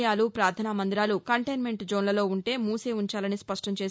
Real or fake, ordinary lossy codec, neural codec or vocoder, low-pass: real; none; none; none